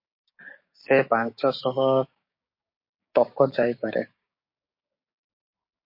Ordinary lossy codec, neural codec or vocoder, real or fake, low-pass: MP3, 32 kbps; codec, 16 kHz, 6 kbps, DAC; fake; 5.4 kHz